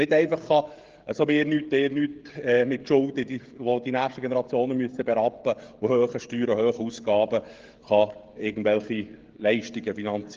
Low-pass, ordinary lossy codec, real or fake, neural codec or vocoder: 7.2 kHz; Opus, 16 kbps; fake; codec, 16 kHz, 16 kbps, FreqCodec, smaller model